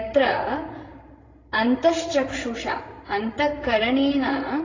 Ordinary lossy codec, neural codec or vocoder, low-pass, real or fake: AAC, 32 kbps; codec, 16 kHz, 16 kbps, FreqCodec, larger model; 7.2 kHz; fake